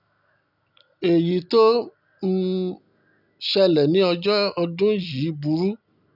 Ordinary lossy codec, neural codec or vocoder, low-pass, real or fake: none; none; 5.4 kHz; real